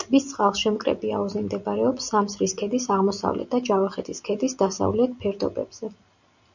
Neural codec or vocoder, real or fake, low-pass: none; real; 7.2 kHz